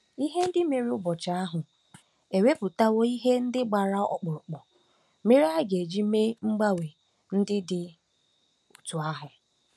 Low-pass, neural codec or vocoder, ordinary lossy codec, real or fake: none; none; none; real